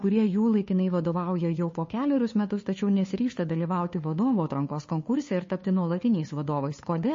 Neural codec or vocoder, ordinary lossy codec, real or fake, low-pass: codec, 16 kHz, 2 kbps, FunCodec, trained on Chinese and English, 25 frames a second; MP3, 32 kbps; fake; 7.2 kHz